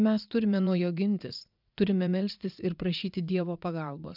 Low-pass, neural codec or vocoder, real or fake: 5.4 kHz; vocoder, 44.1 kHz, 80 mel bands, Vocos; fake